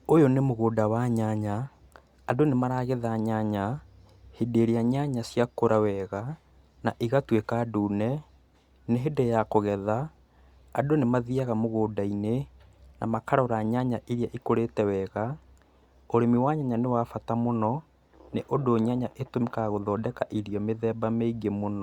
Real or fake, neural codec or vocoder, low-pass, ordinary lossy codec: real; none; 19.8 kHz; Opus, 64 kbps